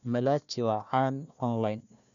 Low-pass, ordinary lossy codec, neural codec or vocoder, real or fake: 7.2 kHz; none; codec, 16 kHz, 1 kbps, FunCodec, trained on Chinese and English, 50 frames a second; fake